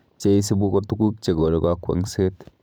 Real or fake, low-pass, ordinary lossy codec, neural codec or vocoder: fake; none; none; vocoder, 44.1 kHz, 128 mel bands every 512 samples, BigVGAN v2